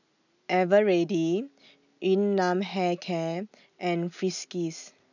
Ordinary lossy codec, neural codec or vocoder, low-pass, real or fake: none; none; 7.2 kHz; real